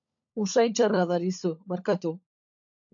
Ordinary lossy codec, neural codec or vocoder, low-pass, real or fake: AAC, 64 kbps; codec, 16 kHz, 16 kbps, FunCodec, trained on LibriTTS, 50 frames a second; 7.2 kHz; fake